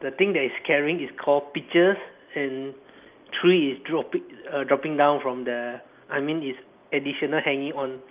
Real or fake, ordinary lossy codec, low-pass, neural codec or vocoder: real; Opus, 24 kbps; 3.6 kHz; none